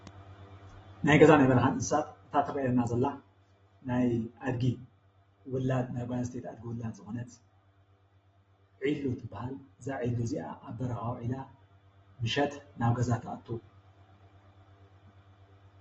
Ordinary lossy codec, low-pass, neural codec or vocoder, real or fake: AAC, 24 kbps; 19.8 kHz; none; real